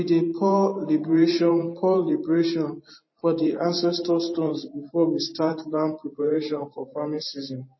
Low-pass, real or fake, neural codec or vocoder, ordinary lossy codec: 7.2 kHz; fake; vocoder, 44.1 kHz, 128 mel bands every 512 samples, BigVGAN v2; MP3, 24 kbps